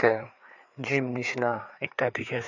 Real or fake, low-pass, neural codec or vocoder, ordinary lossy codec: fake; 7.2 kHz; codec, 16 kHz, 4 kbps, FreqCodec, larger model; none